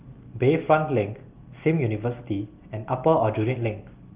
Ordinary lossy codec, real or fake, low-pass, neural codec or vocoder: Opus, 32 kbps; real; 3.6 kHz; none